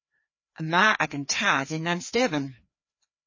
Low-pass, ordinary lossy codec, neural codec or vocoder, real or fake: 7.2 kHz; MP3, 32 kbps; codec, 16 kHz, 2 kbps, FreqCodec, larger model; fake